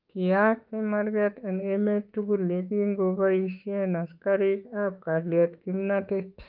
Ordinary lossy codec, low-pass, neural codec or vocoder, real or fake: Opus, 24 kbps; 5.4 kHz; autoencoder, 48 kHz, 32 numbers a frame, DAC-VAE, trained on Japanese speech; fake